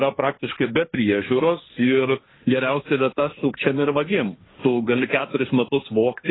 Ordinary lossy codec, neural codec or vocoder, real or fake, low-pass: AAC, 16 kbps; codec, 16 kHz, 1.1 kbps, Voila-Tokenizer; fake; 7.2 kHz